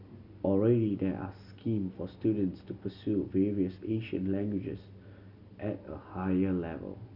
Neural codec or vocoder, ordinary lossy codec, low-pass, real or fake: none; none; 5.4 kHz; real